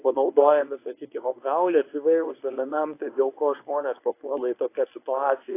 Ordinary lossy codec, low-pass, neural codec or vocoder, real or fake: AAC, 24 kbps; 3.6 kHz; codec, 24 kHz, 0.9 kbps, WavTokenizer, medium speech release version 1; fake